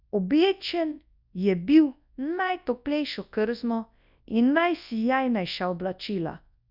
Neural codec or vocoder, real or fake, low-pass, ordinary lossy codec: codec, 24 kHz, 0.9 kbps, WavTokenizer, large speech release; fake; 5.4 kHz; none